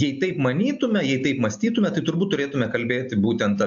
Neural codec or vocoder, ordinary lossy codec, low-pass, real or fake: none; AAC, 64 kbps; 7.2 kHz; real